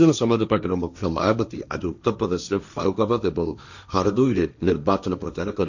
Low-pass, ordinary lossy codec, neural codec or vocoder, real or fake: 7.2 kHz; none; codec, 16 kHz, 1.1 kbps, Voila-Tokenizer; fake